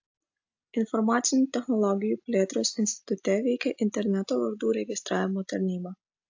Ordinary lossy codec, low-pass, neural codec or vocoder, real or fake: AAC, 48 kbps; 7.2 kHz; none; real